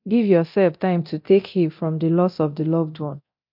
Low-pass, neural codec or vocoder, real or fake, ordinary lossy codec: 5.4 kHz; codec, 24 kHz, 0.9 kbps, DualCodec; fake; MP3, 48 kbps